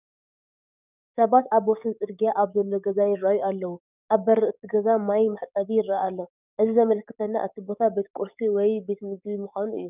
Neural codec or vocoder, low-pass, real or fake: codec, 44.1 kHz, 7.8 kbps, DAC; 3.6 kHz; fake